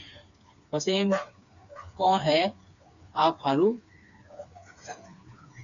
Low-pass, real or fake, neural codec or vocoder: 7.2 kHz; fake; codec, 16 kHz, 4 kbps, FreqCodec, smaller model